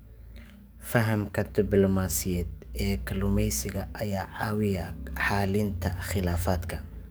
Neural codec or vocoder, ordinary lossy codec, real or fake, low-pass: codec, 44.1 kHz, 7.8 kbps, DAC; none; fake; none